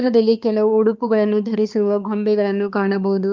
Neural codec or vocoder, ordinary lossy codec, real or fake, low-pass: codec, 16 kHz, 2 kbps, X-Codec, HuBERT features, trained on balanced general audio; Opus, 32 kbps; fake; 7.2 kHz